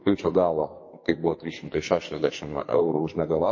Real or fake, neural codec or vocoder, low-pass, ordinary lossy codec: fake; codec, 44.1 kHz, 2.6 kbps, SNAC; 7.2 kHz; MP3, 32 kbps